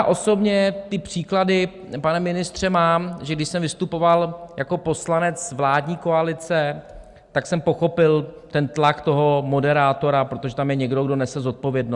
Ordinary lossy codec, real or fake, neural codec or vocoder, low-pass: Opus, 64 kbps; real; none; 10.8 kHz